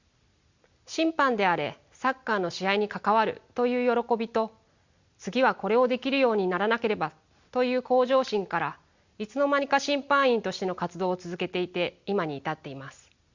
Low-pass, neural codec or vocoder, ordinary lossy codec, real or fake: 7.2 kHz; none; Opus, 64 kbps; real